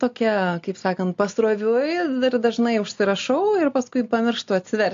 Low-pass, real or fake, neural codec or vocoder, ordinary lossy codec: 7.2 kHz; real; none; AAC, 48 kbps